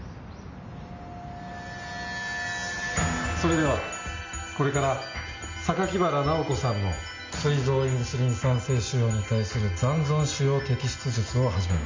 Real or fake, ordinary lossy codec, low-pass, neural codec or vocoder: real; MP3, 48 kbps; 7.2 kHz; none